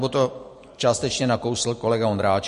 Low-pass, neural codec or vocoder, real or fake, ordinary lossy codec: 14.4 kHz; none; real; MP3, 48 kbps